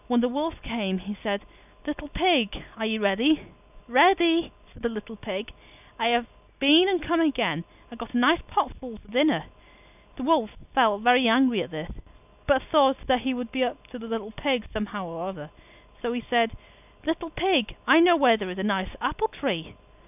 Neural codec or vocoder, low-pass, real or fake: none; 3.6 kHz; real